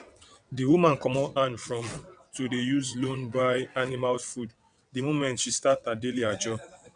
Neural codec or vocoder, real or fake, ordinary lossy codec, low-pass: vocoder, 22.05 kHz, 80 mel bands, WaveNeXt; fake; none; 9.9 kHz